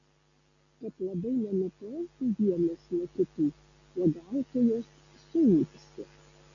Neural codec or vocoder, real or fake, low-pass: none; real; 7.2 kHz